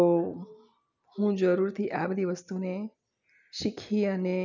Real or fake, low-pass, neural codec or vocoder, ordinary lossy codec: real; 7.2 kHz; none; none